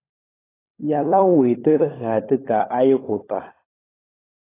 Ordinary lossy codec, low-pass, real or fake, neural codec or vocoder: AAC, 16 kbps; 3.6 kHz; fake; codec, 16 kHz, 16 kbps, FunCodec, trained on LibriTTS, 50 frames a second